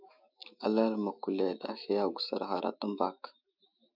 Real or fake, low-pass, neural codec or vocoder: fake; 5.4 kHz; autoencoder, 48 kHz, 128 numbers a frame, DAC-VAE, trained on Japanese speech